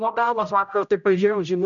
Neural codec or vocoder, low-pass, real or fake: codec, 16 kHz, 0.5 kbps, X-Codec, HuBERT features, trained on general audio; 7.2 kHz; fake